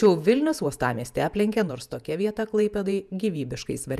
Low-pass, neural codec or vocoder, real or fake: 14.4 kHz; vocoder, 48 kHz, 128 mel bands, Vocos; fake